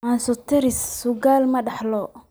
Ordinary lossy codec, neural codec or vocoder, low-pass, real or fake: none; none; none; real